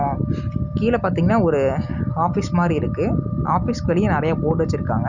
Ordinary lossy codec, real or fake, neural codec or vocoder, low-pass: none; real; none; 7.2 kHz